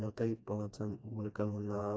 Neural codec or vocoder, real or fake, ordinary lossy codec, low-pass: codec, 16 kHz, 2 kbps, FreqCodec, smaller model; fake; none; none